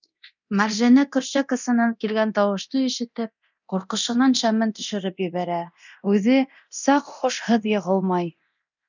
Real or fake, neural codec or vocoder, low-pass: fake; codec, 24 kHz, 0.9 kbps, DualCodec; 7.2 kHz